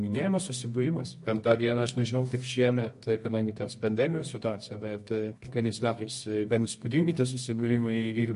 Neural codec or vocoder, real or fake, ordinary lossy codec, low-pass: codec, 24 kHz, 0.9 kbps, WavTokenizer, medium music audio release; fake; MP3, 48 kbps; 10.8 kHz